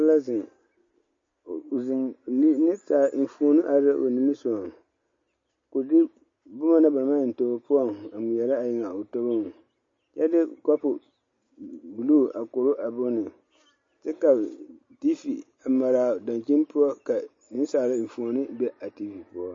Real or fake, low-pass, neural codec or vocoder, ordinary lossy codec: real; 7.2 kHz; none; MP3, 32 kbps